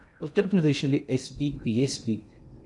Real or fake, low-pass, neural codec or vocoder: fake; 10.8 kHz; codec, 16 kHz in and 24 kHz out, 0.6 kbps, FocalCodec, streaming, 2048 codes